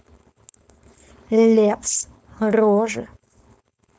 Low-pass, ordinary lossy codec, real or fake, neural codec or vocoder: none; none; fake; codec, 16 kHz, 4.8 kbps, FACodec